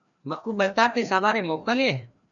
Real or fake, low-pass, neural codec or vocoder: fake; 7.2 kHz; codec, 16 kHz, 1 kbps, FreqCodec, larger model